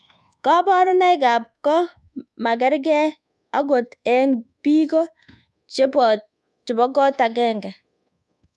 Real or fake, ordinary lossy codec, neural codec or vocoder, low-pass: fake; none; codec, 24 kHz, 1.2 kbps, DualCodec; none